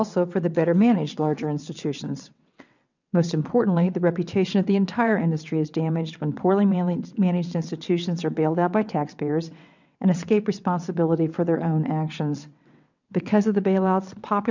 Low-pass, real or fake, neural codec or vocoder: 7.2 kHz; fake; vocoder, 22.05 kHz, 80 mel bands, WaveNeXt